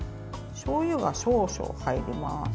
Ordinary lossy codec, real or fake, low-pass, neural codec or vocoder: none; real; none; none